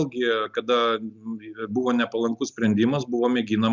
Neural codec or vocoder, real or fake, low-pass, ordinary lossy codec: none; real; 7.2 kHz; Opus, 64 kbps